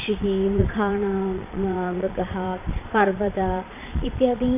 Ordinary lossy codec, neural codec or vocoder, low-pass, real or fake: AAC, 24 kbps; vocoder, 22.05 kHz, 80 mel bands, Vocos; 3.6 kHz; fake